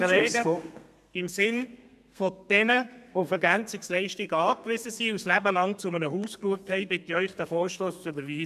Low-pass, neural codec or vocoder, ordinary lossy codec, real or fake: 14.4 kHz; codec, 32 kHz, 1.9 kbps, SNAC; none; fake